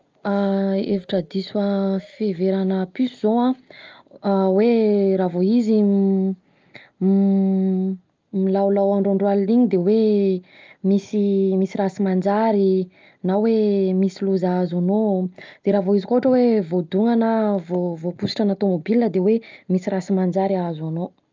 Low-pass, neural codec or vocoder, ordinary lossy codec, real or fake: 7.2 kHz; none; Opus, 24 kbps; real